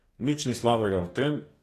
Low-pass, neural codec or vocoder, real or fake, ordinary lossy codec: 14.4 kHz; codec, 44.1 kHz, 2.6 kbps, DAC; fake; AAC, 48 kbps